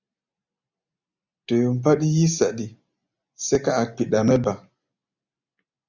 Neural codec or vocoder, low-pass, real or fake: none; 7.2 kHz; real